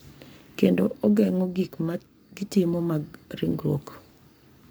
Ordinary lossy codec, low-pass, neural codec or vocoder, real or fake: none; none; codec, 44.1 kHz, 7.8 kbps, Pupu-Codec; fake